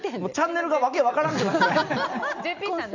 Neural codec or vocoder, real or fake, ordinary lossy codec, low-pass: none; real; none; 7.2 kHz